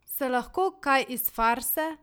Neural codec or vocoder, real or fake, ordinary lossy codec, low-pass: none; real; none; none